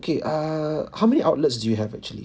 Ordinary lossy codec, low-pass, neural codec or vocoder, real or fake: none; none; none; real